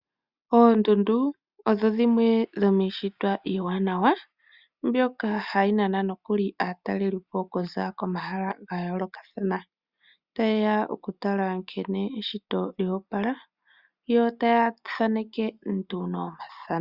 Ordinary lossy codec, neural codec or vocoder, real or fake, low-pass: Opus, 64 kbps; none; real; 5.4 kHz